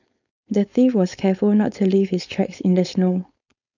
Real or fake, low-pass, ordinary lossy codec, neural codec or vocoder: fake; 7.2 kHz; none; codec, 16 kHz, 4.8 kbps, FACodec